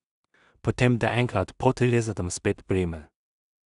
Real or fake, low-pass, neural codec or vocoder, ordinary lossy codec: fake; 10.8 kHz; codec, 16 kHz in and 24 kHz out, 0.4 kbps, LongCat-Audio-Codec, two codebook decoder; none